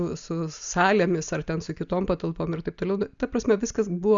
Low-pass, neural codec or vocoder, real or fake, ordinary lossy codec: 7.2 kHz; none; real; Opus, 64 kbps